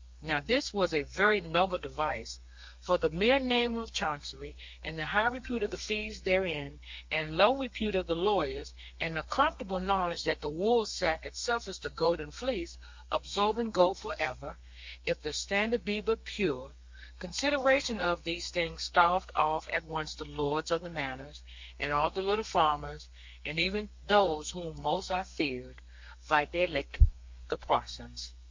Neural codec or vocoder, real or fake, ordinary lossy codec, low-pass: codec, 32 kHz, 1.9 kbps, SNAC; fake; MP3, 48 kbps; 7.2 kHz